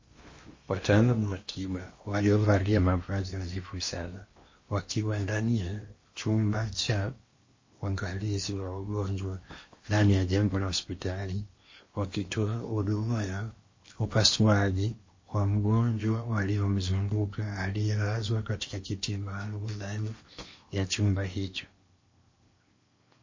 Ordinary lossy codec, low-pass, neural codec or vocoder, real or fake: MP3, 32 kbps; 7.2 kHz; codec, 16 kHz in and 24 kHz out, 0.8 kbps, FocalCodec, streaming, 65536 codes; fake